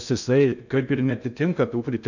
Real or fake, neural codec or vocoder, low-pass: fake; codec, 16 kHz in and 24 kHz out, 0.6 kbps, FocalCodec, streaming, 2048 codes; 7.2 kHz